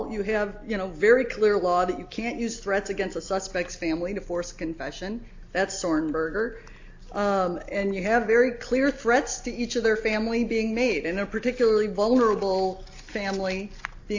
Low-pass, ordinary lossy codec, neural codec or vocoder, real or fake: 7.2 kHz; AAC, 48 kbps; none; real